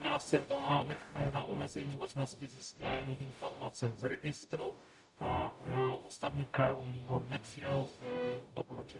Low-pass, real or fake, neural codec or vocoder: 10.8 kHz; fake; codec, 44.1 kHz, 0.9 kbps, DAC